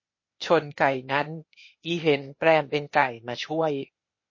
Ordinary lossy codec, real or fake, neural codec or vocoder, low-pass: MP3, 32 kbps; fake; codec, 16 kHz, 0.8 kbps, ZipCodec; 7.2 kHz